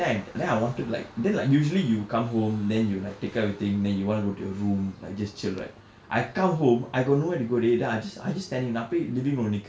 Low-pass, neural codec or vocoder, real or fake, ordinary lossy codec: none; none; real; none